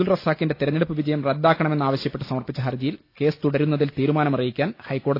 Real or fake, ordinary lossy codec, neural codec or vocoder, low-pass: real; AAC, 32 kbps; none; 5.4 kHz